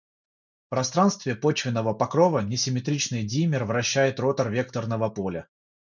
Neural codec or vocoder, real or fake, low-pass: none; real; 7.2 kHz